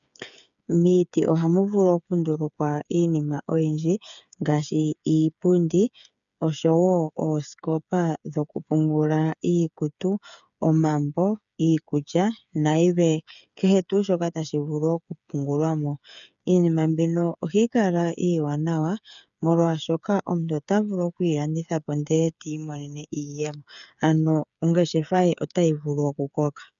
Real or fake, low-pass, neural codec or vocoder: fake; 7.2 kHz; codec, 16 kHz, 8 kbps, FreqCodec, smaller model